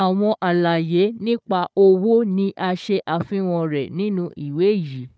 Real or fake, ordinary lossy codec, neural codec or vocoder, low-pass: fake; none; codec, 16 kHz, 4 kbps, FunCodec, trained on Chinese and English, 50 frames a second; none